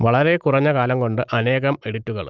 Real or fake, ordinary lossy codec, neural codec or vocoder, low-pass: real; Opus, 24 kbps; none; 7.2 kHz